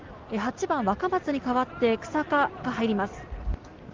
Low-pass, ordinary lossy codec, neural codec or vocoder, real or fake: 7.2 kHz; Opus, 16 kbps; none; real